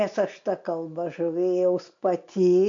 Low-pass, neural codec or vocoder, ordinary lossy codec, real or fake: 7.2 kHz; none; MP3, 64 kbps; real